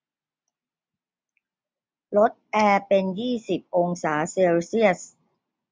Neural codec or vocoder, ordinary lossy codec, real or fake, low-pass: none; none; real; none